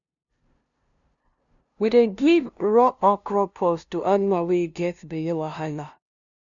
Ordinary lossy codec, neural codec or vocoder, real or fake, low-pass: none; codec, 16 kHz, 0.5 kbps, FunCodec, trained on LibriTTS, 25 frames a second; fake; 7.2 kHz